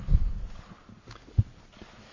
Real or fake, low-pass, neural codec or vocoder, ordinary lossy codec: real; 7.2 kHz; none; AAC, 32 kbps